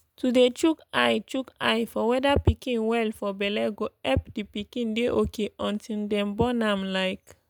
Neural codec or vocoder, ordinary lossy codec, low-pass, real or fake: none; none; none; real